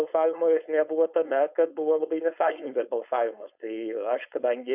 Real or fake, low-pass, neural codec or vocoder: fake; 3.6 kHz; codec, 16 kHz, 4.8 kbps, FACodec